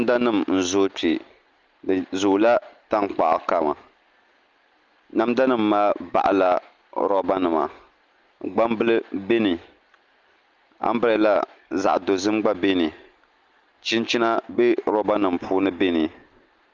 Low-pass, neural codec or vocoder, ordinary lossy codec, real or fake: 7.2 kHz; none; Opus, 24 kbps; real